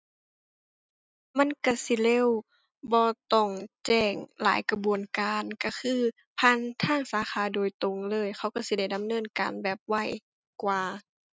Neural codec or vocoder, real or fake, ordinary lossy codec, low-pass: none; real; none; none